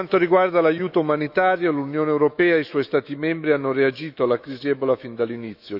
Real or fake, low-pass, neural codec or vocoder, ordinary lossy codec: fake; 5.4 kHz; autoencoder, 48 kHz, 128 numbers a frame, DAC-VAE, trained on Japanese speech; none